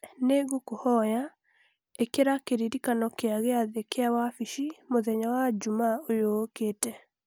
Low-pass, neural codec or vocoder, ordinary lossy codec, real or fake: none; none; none; real